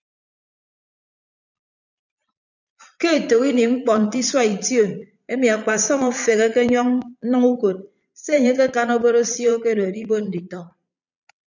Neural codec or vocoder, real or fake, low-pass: vocoder, 22.05 kHz, 80 mel bands, Vocos; fake; 7.2 kHz